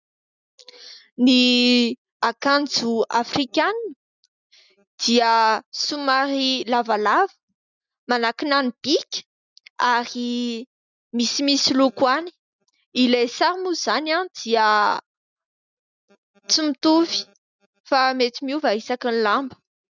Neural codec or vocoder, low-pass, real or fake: none; 7.2 kHz; real